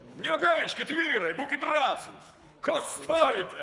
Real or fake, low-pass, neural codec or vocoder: fake; 10.8 kHz; codec, 24 kHz, 3 kbps, HILCodec